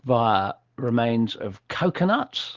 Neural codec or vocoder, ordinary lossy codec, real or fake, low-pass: none; Opus, 16 kbps; real; 7.2 kHz